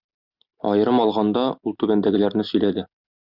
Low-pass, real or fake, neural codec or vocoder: 5.4 kHz; real; none